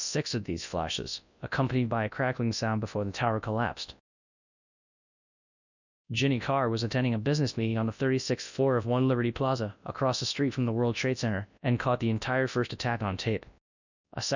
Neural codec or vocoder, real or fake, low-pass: codec, 24 kHz, 0.9 kbps, WavTokenizer, large speech release; fake; 7.2 kHz